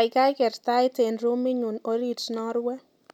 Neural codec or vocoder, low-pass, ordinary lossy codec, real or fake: none; 19.8 kHz; none; real